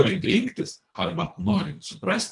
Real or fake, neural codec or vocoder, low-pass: fake; codec, 24 kHz, 1.5 kbps, HILCodec; 10.8 kHz